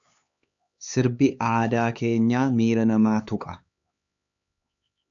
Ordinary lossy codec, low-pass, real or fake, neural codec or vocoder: AAC, 64 kbps; 7.2 kHz; fake; codec, 16 kHz, 4 kbps, X-Codec, HuBERT features, trained on LibriSpeech